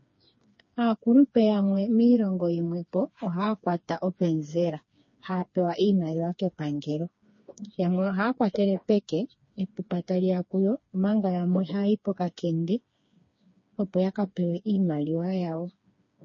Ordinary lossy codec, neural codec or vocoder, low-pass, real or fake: MP3, 32 kbps; codec, 16 kHz, 4 kbps, FreqCodec, smaller model; 7.2 kHz; fake